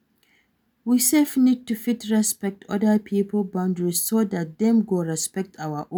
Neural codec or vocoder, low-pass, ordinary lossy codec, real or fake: none; none; none; real